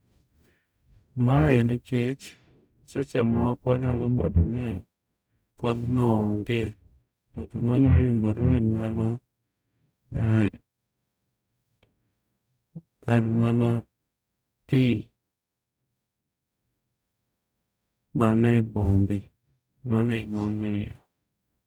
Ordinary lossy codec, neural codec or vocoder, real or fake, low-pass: none; codec, 44.1 kHz, 0.9 kbps, DAC; fake; none